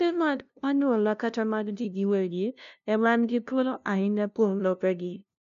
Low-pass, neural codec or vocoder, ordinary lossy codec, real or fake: 7.2 kHz; codec, 16 kHz, 0.5 kbps, FunCodec, trained on LibriTTS, 25 frames a second; none; fake